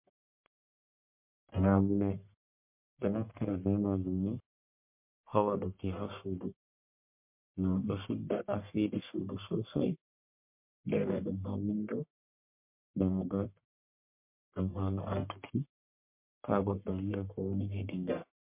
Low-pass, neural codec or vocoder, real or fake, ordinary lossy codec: 3.6 kHz; codec, 44.1 kHz, 1.7 kbps, Pupu-Codec; fake; MP3, 32 kbps